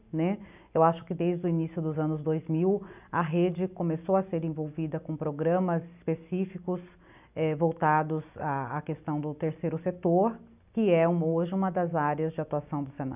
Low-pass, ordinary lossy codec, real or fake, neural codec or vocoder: 3.6 kHz; none; real; none